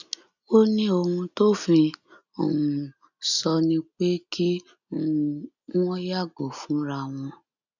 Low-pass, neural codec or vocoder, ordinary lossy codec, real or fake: 7.2 kHz; none; none; real